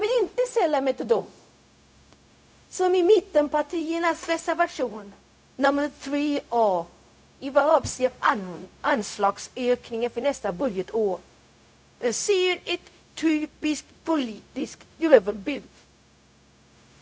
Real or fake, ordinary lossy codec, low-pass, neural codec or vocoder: fake; none; none; codec, 16 kHz, 0.4 kbps, LongCat-Audio-Codec